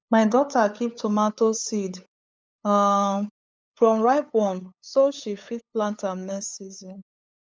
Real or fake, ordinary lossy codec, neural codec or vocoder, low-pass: fake; none; codec, 16 kHz, 8 kbps, FunCodec, trained on LibriTTS, 25 frames a second; none